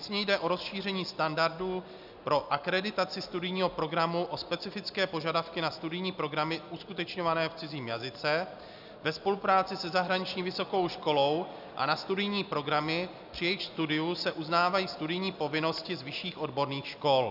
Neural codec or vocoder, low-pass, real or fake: none; 5.4 kHz; real